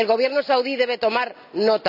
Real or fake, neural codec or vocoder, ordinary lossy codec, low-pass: real; none; none; 5.4 kHz